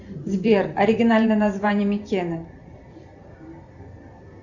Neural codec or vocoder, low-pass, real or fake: vocoder, 44.1 kHz, 128 mel bands every 512 samples, BigVGAN v2; 7.2 kHz; fake